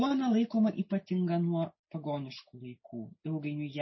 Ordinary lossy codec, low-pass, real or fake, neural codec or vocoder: MP3, 24 kbps; 7.2 kHz; real; none